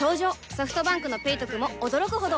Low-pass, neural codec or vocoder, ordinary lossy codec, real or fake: none; none; none; real